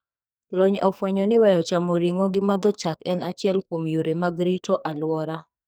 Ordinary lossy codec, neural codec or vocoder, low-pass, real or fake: none; codec, 44.1 kHz, 2.6 kbps, SNAC; none; fake